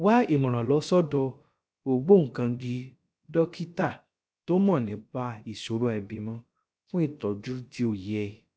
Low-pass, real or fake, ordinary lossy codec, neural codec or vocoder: none; fake; none; codec, 16 kHz, about 1 kbps, DyCAST, with the encoder's durations